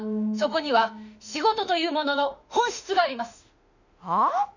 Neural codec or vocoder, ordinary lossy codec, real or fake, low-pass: autoencoder, 48 kHz, 32 numbers a frame, DAC-VAE, trained on Japanese speech; none; fake; 7.2 kHz